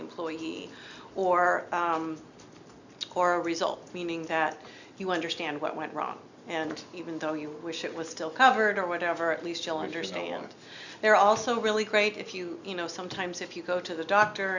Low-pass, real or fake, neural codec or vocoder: 7.2 kHz; real; none